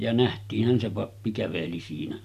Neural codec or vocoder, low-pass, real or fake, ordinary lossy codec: none; 14.4 kHz; real; none